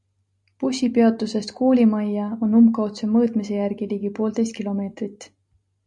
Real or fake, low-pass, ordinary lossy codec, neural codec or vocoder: real; 10.8 kHz; MP3, 96 kbps; none